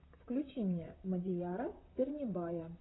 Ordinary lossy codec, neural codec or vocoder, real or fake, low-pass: AAC, 16 kbps; vocoder, 44.1 kHz, 128 mel bands, Pupu-Vocoder; fake; 7.2 kHz